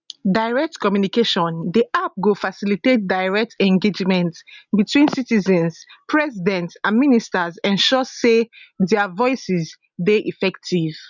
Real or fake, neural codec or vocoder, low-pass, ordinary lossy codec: real; none; 7.2 kHz; none